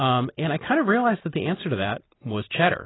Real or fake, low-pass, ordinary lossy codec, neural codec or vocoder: real; 7.2 kHz; AAC, 16 kbps; none